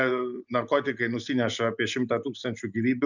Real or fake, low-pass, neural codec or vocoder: real; 7.2 kHz; none